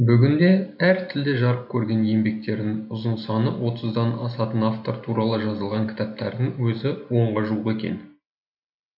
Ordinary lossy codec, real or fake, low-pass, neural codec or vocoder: none; real; 5.4 kHz; none